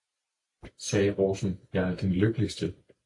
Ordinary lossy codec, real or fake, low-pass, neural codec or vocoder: AAC, 48 kbps; real; 10.8 kHz; none